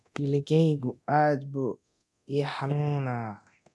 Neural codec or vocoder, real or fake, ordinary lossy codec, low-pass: codec, 24 kHz, 0.9 kbps, DualCodec; fake; none; none